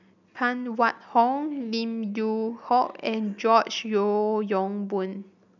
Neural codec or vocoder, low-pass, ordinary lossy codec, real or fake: none; 7.2 kHz; none; real